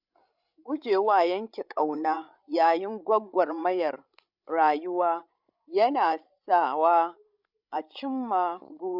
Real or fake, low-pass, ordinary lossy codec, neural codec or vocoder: fake; 5.4 kHz; none; codec, 16 kHz, 16 kbps, FreqCodec, larger model